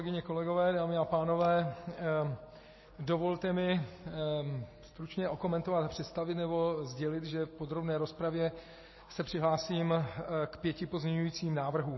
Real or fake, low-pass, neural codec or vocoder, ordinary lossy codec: real; 7.2 kHz; none; MP3, 24 kbps